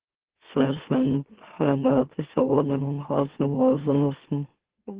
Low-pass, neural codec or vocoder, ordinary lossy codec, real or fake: 3.6 kHz; autoencoder, 44.1 kHz, a latent of 192 numbers a frame, MeloTTS; Opus, 16 kbps; fake